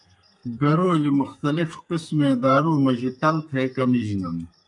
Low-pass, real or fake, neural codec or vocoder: 10.8 kHz; fake; codec, 32 kHz, 1.9 kbps, SNAC